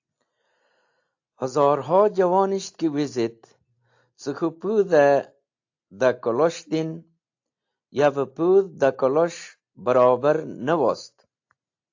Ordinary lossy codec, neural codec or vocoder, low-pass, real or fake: AAC, 48 kbps; none; 7.2 kHz; real